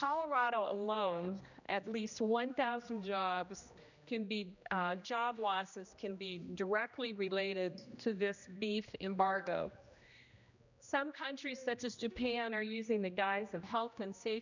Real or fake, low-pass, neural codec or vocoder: fake; 7.2 kHz; codec, 16 kHz, 1 kbps, X-Codec, HuBERT features, trained on general audio